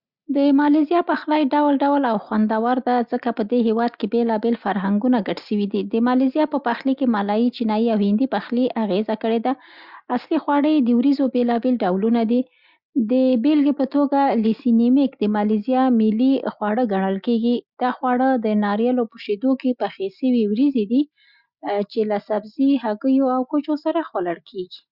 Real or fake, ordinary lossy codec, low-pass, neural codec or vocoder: real; Opus, 64 kbps; 5.4 kHz; none